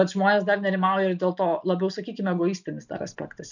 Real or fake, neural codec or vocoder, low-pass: real; none; 7.2 kHz